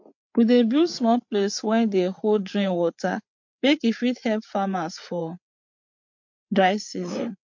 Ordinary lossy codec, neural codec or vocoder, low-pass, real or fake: MP3, 48 kbps; codec, 16 kHz, 8 kbps, FreqCodec, larger model; 7.2 kHz; fake